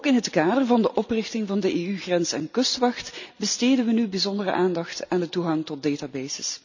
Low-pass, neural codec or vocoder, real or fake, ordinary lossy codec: 7.2 kHz; none; real; none